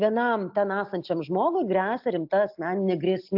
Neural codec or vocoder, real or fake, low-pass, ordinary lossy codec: none; real; 5.4 kHz; AAC, 48 kbps